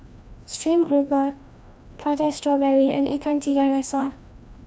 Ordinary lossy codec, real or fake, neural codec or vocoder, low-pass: none; fake; codec, 16 kHz, 1 kbps, FreqCodec, larger model; none